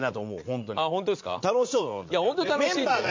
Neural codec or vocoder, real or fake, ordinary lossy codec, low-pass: vocoder, 44.1 kHz, 80 mel bands, Vocos; fake; MP3, 48 kbps; 7.2 kHz